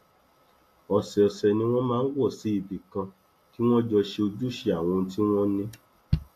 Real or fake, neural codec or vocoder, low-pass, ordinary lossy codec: real; none; 14.4 kHz; AAC, 64 kbps